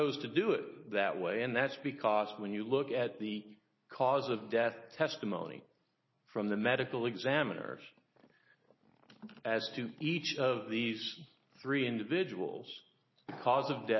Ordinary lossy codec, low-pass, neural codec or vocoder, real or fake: MP3, 24 kbps; 7.2 kHz; none; real